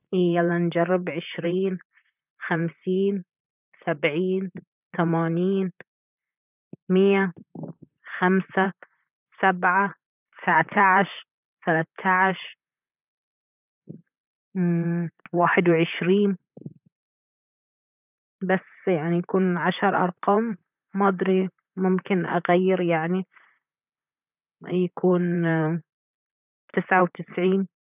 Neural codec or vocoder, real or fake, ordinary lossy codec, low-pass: vocoder, 44.1 kHz, 128 mel bands, Pupu-Vocoder; fake; none; 3.6 kHz